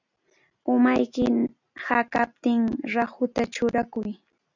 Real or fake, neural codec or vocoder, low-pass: real; none; 7.2 kHz